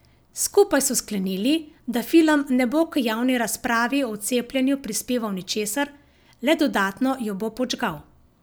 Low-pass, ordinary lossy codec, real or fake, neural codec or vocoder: none; none; real; none